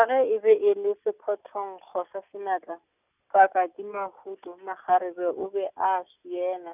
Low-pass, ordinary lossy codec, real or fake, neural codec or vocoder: 3.6 kHz; none; real; none